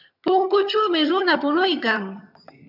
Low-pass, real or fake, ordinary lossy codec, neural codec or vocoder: 5.4 kHz; fake; AAC, 48 kbps; vocoder, 22.05 kHz, 80 mel bands, HiFi-GAN